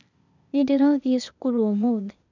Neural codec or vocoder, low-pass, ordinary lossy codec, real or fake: codec, 16 kHz, 0.8 kbps, ZipCodec; 7.2 kHz; none; fake